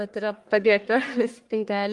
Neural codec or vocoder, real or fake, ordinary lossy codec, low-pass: codec, 44.1 kHz, 1.7 kbps, Pupu-Codec; fake; Opus, 32 kbps; 10.8 kHz